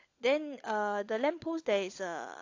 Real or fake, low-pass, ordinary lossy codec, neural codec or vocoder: fake; 7.2 kHz; AAC, 48 kbps; codec, 16 kHz, 16 kbps, FunCodec, trained on LibriTTS, 50 frames a second